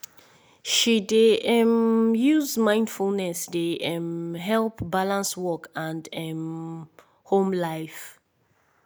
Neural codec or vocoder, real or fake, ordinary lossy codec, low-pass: none; real; none; none